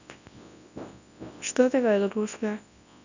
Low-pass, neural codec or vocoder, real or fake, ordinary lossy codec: 7.2 kHz; codec, 24 kHz, 0.9 kbps, WavTokenizer, large speech release; fake; none